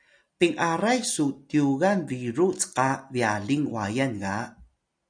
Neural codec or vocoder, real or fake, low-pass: none; real; 9.9 kHz